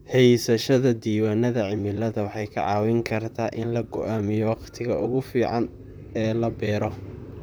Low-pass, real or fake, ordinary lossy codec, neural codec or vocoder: none; fake; none; vocoder, 44.1 kHz, 128 mel bands, Pupu-Vocoder